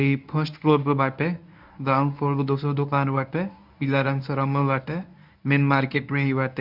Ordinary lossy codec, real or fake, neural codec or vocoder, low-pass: none; fake; codec, 24 kHz, 0.9 kbps, WavTokenizer, medium speech release version 1; 5.4 kHz